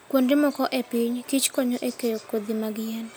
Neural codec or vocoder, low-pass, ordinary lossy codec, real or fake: none; none; none; real